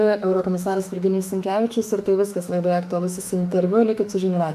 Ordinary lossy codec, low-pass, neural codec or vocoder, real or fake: AAC, 96 kbps; 14.4 kHz; autoencoder, 48 kHz, 32 numbers a frame, DAC-VAE, trained on Japanese speech; fake